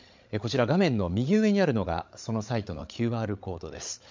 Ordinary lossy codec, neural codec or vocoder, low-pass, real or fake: MP3, 64 kbps; codec, 16 kHz, 8 kbps, FreqCodec, larger model; 7.2 kHz; fake